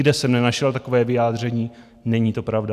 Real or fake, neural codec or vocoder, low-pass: real; none; 14.4 kHz